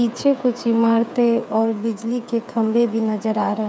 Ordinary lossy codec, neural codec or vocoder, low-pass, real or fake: none; codec, 16 kHz, 8 kbps, FreqCodec, smaller model; none; fake